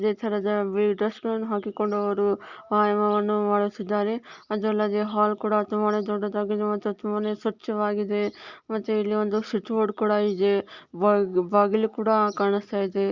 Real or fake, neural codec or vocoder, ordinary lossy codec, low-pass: real; none; Opus, 64 kbps; 7.2 kHz